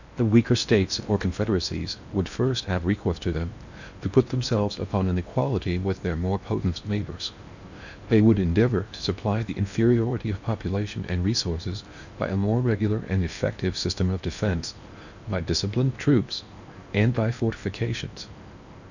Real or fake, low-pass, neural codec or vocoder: fake; 7.2 kHz; codec, 16 kHz in and 24 kHz out, 0.8 kbps, FocalCodec, streaming, 65536 codes